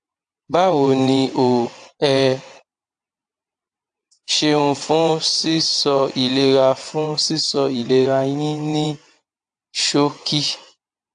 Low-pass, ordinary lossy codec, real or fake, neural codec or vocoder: 9.9 kHz; AAC, 64 kbps; fake; vocoder, 22.05 kHz, 80 mel bands, WaveNeXt